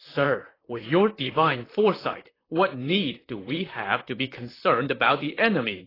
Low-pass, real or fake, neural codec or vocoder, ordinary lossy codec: 5.4 kHz; fake; vocoder, 44.1 kHz, 128 mel bands, Pupu-Vocoder; AAC, 24 kbps